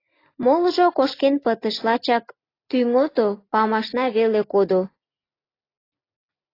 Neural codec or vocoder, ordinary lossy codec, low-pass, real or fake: none; AAC, 24 kbps; 5.4 kHz; real